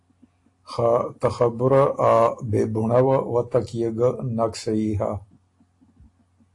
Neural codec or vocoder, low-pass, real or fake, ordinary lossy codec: none; 10.8 kHz; real; AAC, 48 kbps